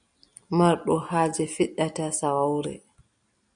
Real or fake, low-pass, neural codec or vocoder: real; 9.9 kHz; none